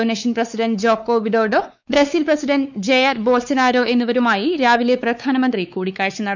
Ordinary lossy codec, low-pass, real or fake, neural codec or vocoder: none; 7.2 kHz; fake; codec, 16 kHz, 4 kbps, X-Codec, WavLM features, trained on Multilingual LibriSpeech